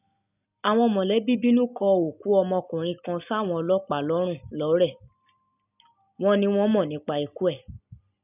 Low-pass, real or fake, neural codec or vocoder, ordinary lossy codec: 3.6 kHz; real; none; none